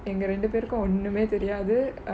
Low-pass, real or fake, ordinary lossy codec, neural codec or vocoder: none; real; none; none